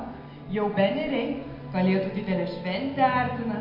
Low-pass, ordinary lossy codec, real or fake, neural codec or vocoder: 5.4 kHz; MP3, 32 kbps; real; none